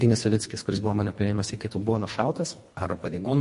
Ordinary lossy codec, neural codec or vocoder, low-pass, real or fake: MP3, 48 kbps; codec, 24 kHz, 1.5 kbps, HILCodec; 10.8 kHz; fake